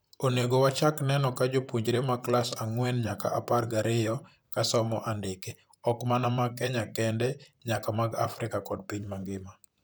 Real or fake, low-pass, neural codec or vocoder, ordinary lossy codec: fake; none; vocoder, 44.1 kHz, 128 mel bands, Pupu-Vocoder; none